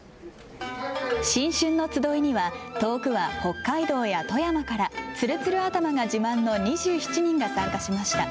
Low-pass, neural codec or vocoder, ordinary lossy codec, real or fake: none; none; none; real